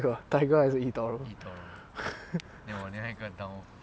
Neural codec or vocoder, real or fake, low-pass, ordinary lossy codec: none; real; none; none